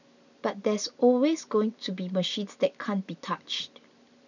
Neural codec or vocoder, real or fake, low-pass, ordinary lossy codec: none; real; 7.2 kHz; none